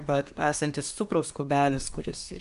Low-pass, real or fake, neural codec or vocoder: 10.8 kHz; fake; codec, 24 kHz, 1 kbps, SNAC